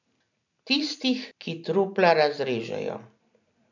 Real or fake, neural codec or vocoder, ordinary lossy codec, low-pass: real; none; none; 7.2 kHz